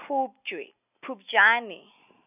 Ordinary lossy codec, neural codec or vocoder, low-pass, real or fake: none; none; 3.6 kHz; real